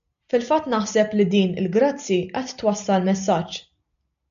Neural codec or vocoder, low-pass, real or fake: none; 7.2 kHz; real